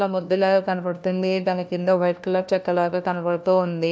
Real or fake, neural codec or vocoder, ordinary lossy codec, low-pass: fake; codec, 16 kHz, 1 kbps, FunCodec, trained on LibriTTS, 50 frames a second; none; none